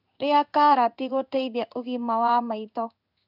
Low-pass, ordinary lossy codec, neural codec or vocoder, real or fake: 5.4 kHz; none; codec, 16 kHz in and 24 kHz out, 1 kbps, XY-Tokenizer; fake